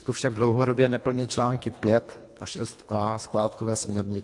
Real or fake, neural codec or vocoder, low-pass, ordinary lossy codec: fake; codec, 24 kHz, 1.5 kbps, HILCodec; 10.8 kHz; AAC, 64 kbps